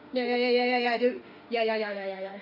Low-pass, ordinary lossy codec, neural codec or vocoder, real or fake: 5.4 kHz; none; autoencoder, 48 kHz, 32 numbers a frame, DAC-VAE, trained on Japanese speech; fake